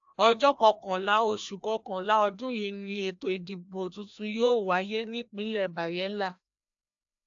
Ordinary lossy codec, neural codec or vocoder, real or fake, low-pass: AAC, 64 kbps; codec, 16 kHz, 1 kbps, FreqCodec, larger model; fake; 7.2 kHz